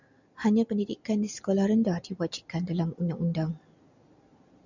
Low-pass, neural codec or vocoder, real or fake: 7.2 kHz; none; real